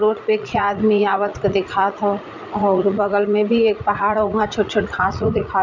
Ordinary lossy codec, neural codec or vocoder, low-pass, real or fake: none; vocoder, 44.1 kHz, 80 mel bands, Vocos; 7.2 kHz; fake